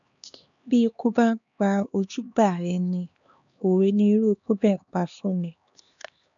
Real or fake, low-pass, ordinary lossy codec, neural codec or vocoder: fake; 7.2 kHz; none; codec, 16 kHz, 2 kbps, X-Codec, HuBERT features, trained on LibriSpeech